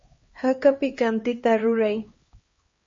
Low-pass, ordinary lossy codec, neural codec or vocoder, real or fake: 7.2 kHz; MP3, 32 kbps; codec, 16 kHz, 2 kbps, X-Codec, HuBERT features, trained on LibriSpeech; fake